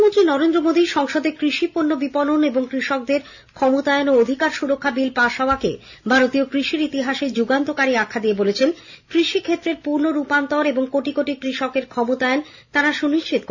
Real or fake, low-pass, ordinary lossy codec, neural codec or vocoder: real; 7.2 kHz; none; none